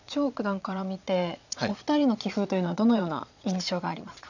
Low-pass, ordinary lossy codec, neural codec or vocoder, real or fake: 7.2 kHz; none; vocoder, 22.05 kHz, 80 mel bands, Vocos; fake